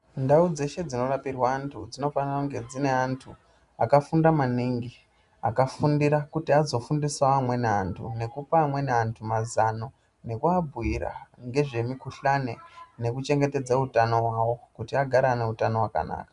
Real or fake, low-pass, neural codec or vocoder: real; 10.8 kHz; none